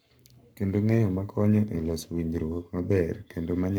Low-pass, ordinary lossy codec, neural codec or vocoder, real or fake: none; none; codec, 44.1 kHz, 7.8 kbps, Pupu-Codec; fake